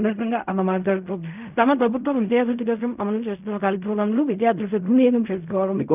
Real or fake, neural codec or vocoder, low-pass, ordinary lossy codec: fake; codec, 16 kHz in and 24 kHz out, 0.4 kbps, LongCat-Audio-Codec, fine tuned four codebook decoder; 3.6 kHz; none